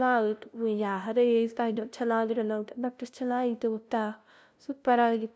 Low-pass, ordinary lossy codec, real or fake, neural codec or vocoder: none; none; fake; codec, 16 kHz, 0.5 kbps, FunCodec, trained on LibriTTS, 25 frames a second